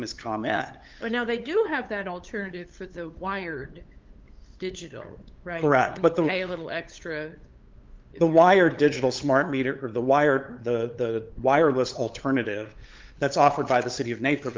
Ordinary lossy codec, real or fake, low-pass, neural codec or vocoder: Opus, 32 kbps; fake; 7.2 kHz; codec, 16 kHz, 8 kbps, FunCodec, trained on LibriTTS, 25 frames a second